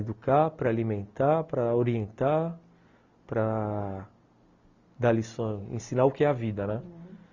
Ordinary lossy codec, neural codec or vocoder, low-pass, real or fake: Opus, 64 kbps; none; 7.2 kHz; real